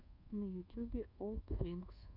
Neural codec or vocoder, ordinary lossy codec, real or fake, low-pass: codec, 24 kHz, 1.2 kbps, DualCodec; Opus, 64 kbps; fake; 5.4 kHz